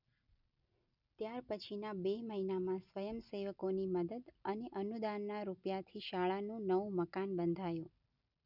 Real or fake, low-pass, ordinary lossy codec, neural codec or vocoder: real; 5.4 kHz; none; none